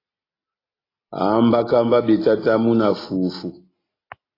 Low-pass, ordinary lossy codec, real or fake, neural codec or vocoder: 5.4 kHz; AAC, 24 kbps; real; none